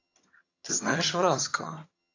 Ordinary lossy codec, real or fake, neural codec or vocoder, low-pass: AAC, 32 kbps; fake; vocoder, 22.05 kHz, 80 mel bands, HiFi-GAN; 7.2 kHz